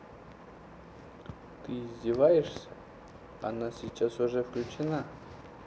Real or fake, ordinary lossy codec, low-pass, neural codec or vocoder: real; none; none; none